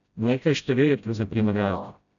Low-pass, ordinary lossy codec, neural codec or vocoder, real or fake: 7.2 kHz; none; codec, 16 kHz, 0.5 kbps, FreqCodec, smaller model; fake